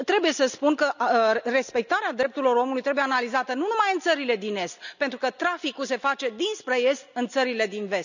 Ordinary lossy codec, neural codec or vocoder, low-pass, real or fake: none; none; 7.2 kHz; real